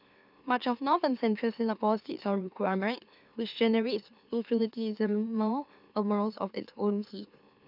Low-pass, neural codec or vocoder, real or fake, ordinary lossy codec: 5.4 kHz; autoencoder, 44.1 kHz, a latent of 192 numbers a frame, MeloTTS; fake; none